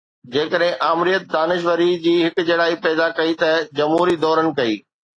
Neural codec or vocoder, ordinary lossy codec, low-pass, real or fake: none; AAC, 32 kbps; 9.9 kHz; real